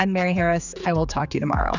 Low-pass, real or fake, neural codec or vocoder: 7.2 kHz; fake; codec, 16 kHz, 4 kbps, X-Codec, HuBERT features, trained on general audio